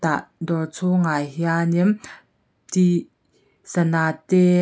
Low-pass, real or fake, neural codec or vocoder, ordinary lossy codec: none; real; none; none